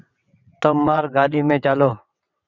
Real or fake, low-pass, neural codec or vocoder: fake; 7.2 kHz; vocoder, 22.05 kHz, 80 mel bands, WaveNeXt